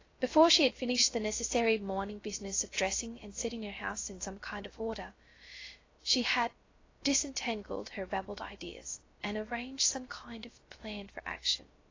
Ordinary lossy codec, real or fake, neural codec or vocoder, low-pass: AAC, 32 kbps; fake; codec, 16 kHz, 0.3 kbps, FocalCodec; 7.2 kHz